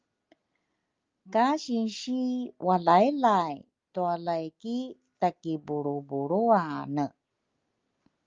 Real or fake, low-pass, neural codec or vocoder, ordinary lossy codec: real; 7.2 kHz; none; Opus, 32 kbps